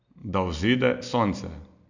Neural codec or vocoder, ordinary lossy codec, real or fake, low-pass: none; none; real; 7.2 kHz